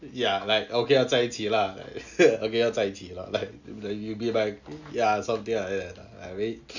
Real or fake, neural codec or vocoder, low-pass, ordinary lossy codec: real; none; 7.2 kHz; none